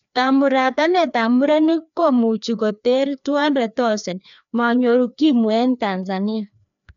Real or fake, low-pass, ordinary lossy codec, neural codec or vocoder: fake; 7.2 kHz; none; codec, 16 kHz, 2 kbps, FreqCodec, larger model